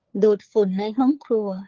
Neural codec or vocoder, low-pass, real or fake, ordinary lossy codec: codec, 16 kHz, 4 kbps, FunCodec, trained on LibriTTS, 50 frames a second; 7.2 kHz; fake; Opus, 16 kbps